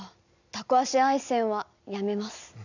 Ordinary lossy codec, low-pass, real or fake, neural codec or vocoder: none; 7.2 kHz; real; none